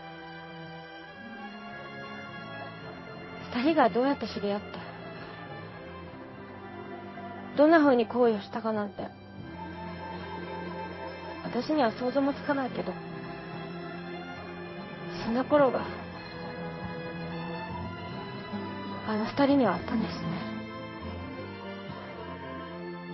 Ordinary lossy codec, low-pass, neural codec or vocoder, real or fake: MP3, 24 kbps; 7.2 kHz; codec, 16 kHz in and 24 kHz out, 1 kbps, XY-Tokenizer; fake